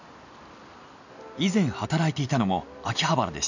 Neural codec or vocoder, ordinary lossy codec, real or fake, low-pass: none; none; real; 7.2 kHz